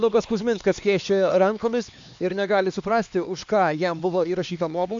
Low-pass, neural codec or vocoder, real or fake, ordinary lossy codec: 7.2 kHz; codec, 16 kHz, 2 kbps, X-Codec, HuBERT features, trained on LibriSpeech; fake; AAC, 64 kbps